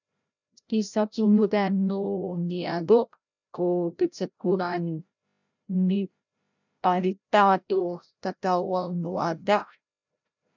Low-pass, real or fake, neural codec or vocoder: 7.2 kHz; fake; codec, 16 kHz, 0.5 kbps, FreqCodec, larger model